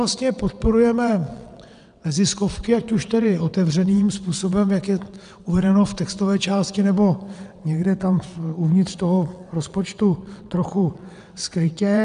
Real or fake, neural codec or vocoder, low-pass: fake; vocoder, 22.05 kHz, 80 mel bands, WaveNeXt; 9.9 kHz